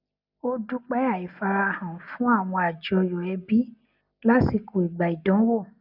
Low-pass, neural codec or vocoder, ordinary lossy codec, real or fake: 5.4 kHz; none; none; real